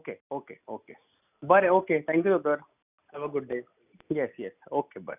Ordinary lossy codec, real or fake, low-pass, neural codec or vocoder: none; real; 3.6 kHz; none